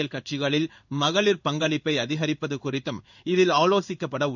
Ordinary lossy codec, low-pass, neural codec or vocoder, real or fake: none; 7.2 kHz; codec, 16 kHz in and 24 kHz out, 1 kbps, XY-Tokenizer; fake